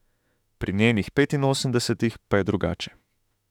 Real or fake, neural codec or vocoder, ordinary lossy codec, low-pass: fake; autoencoder, 48 kHz, 32 numbers a frame, DAC-VAE, trained on Japanese speech; none; 19.8 kHz